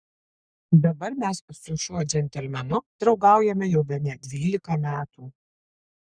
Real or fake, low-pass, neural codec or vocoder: fake; 9.9 kHz; codec, 44.1 kHz, 3.4 kbps, Pupu-Codec